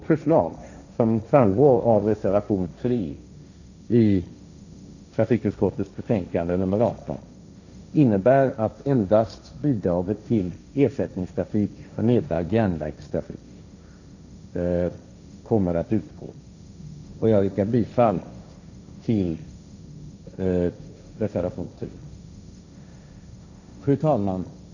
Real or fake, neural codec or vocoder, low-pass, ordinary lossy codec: fake; codec, 16 kHz, 1.1 kbps, Voila-Tokenizer; 7.2 kHz; none